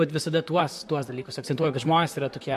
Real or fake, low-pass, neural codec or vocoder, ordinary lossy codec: fake; 14.4 kHz; vocoder, 44.1 kHz, 128 mel bands, Pupu-Vocoder; MP3, 64 kbps